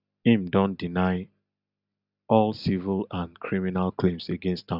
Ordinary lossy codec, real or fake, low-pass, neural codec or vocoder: none; real; 5.4 kHz; none